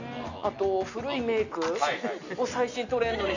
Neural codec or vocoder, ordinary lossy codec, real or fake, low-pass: none; none; real; 7.2 kHz